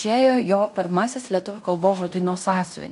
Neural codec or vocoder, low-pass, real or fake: codec, 16 kHz in and 24 kHz out, 0.9 kbps, LongCat-Audio-Codec, fine tuned four codebook decoder; 10.8 kHz; fake